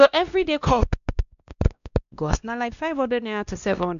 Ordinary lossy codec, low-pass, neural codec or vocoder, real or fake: none; 7.2 kHz; codec, 16 kHz, 1 kbps, X-Codec, WavLM features, trained on Multilingual LibriSpeech; fake